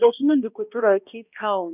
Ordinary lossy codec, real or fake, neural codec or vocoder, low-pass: none; fake; codec, 16 kHz, 1 kbps, X-Codec, HuBERT features, trained on balanced general audio; 3.6 kHz